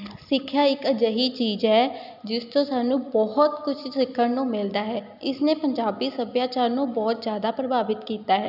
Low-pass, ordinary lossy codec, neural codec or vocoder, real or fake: 5.4 kHz; none; none; real